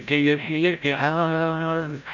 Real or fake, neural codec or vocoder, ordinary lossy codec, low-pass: fake; codec, 16 kHz, 0.5 kbps, FreqCodec, larger model; none; 7.2 kHz